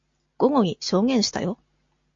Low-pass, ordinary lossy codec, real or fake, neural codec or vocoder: 7.2 kHz; MP3, 48 kbps; real; none